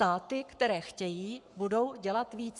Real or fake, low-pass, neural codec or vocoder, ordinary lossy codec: fake; 10.8 kHz; codec, 44.1 kHz, 7.8 kbps, Pupu-Codec; MP3, 96 kbps